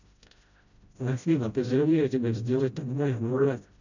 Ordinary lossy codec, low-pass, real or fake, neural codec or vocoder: none; 7.2 kHz; fake; codec, 16 kHz, 0.5 kbps, FreqCodec, smaller model